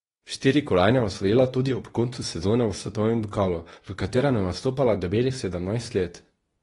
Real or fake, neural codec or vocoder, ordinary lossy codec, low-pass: fake; codec, 24 kHz, 0.9 kbps, WavTokenizer, medium speech release version 2; AAC, 32 kbps; 10.8 kHz